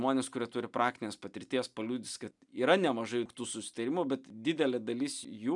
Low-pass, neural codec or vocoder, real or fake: 10.8 kHz; none; real